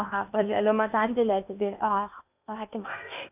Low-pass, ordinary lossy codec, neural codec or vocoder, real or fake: 3.6 kHz; none; codec, 16 kHz in and 24 kHz out, 0.8 kbps, FocalCodec, streaming, 65536 codes; fake